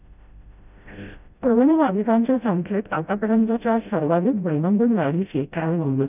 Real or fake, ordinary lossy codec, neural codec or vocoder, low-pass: fake; none; codec, 16 kHz, 0.5 kbps, FreqCodec, smaller model; 3.6 kHz